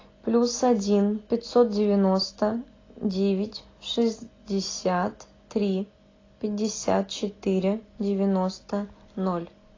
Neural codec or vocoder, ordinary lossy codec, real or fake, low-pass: none; AAC, 32 kbps; real; 7.2 kHz